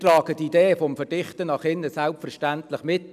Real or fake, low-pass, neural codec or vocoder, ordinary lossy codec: real; 14.4 kHz; none; none